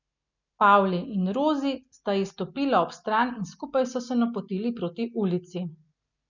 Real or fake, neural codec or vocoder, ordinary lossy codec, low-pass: real; none; none; 7.2 kHz